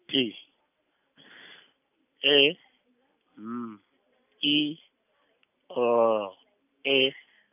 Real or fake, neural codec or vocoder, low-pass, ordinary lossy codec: real; none; 3.6 kHz; none